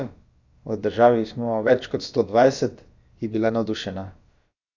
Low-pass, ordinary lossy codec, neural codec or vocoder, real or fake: 7.2 kHz; Opus, 64 kbps; codec, 16 kHz, about 1 kbps, DyCAST, with the encoder's durations; fake